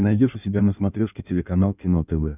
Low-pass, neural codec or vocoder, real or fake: 3.6 kHz; codec, 16 kHz in and 24 kHz out, 1.1 kbps, FireRedTTS-2 codec; fake